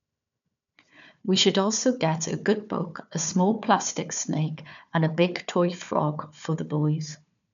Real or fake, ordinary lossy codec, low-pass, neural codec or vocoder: fake; none; 7.2 kHz; codec, 16 kHz, 4 kbps, FunCodec, trained on Chinese and English, 50 frames a second